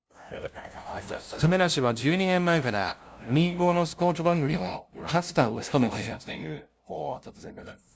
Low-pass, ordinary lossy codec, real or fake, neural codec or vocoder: none; none; fake; codec, 16 kHz, 0.5 kbps, FunCodec, trained on LibriTTS, 25 frames a second